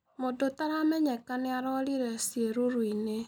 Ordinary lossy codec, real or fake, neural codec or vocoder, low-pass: none; real; none; 19.8 kHz